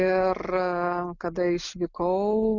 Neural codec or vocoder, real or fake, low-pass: none; real; 7.2 kHz